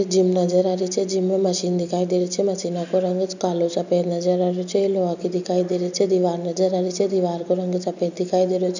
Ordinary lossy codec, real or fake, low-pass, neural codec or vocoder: none; real; 7.2 kHz; none